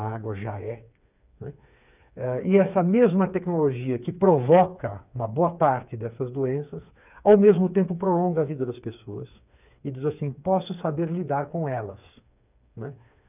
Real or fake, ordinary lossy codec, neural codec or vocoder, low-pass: fake; none; codec, 16 kHz, 4 kbps, FreqCodec, smaller model; 3.6 kHz